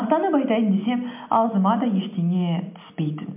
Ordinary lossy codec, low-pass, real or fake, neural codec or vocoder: MP3, 32 kbps; 3.6 kHz; real; none